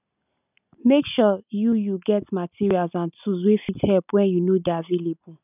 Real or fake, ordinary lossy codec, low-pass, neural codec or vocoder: real; none; 3.6 kHz; none